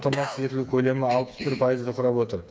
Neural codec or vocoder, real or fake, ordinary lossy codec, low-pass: codec, 16 kHz, 4 kbps, FreqCodec, smaller model; fake; none; none